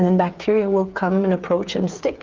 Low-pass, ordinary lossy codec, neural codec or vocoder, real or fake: 7.2 kHz; Opus, 24 kbps; codec, 16 kHz, 8 kbps, FreqCodec, smaller model; fake